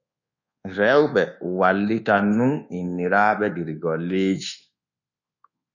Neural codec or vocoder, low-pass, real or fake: codec, 24 kHz, 1.2 kbps, DualCodec; 7.2 kHz; fake